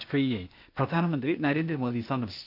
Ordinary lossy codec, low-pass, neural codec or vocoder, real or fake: none; 5.4 kHz; codec, 16 kHz, 0.8 kbps, ZipCodec; fake